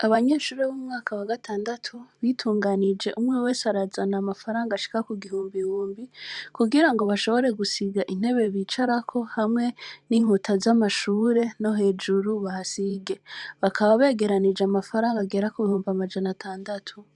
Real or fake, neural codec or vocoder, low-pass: fake; vocoder, 44.1 kHz, 128 mel bands every 512 samples, BigVGAN v2; 10.8 kHz